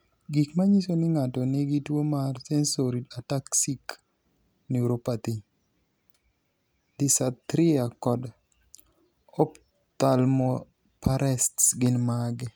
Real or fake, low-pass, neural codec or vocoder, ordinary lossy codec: real; none; none; none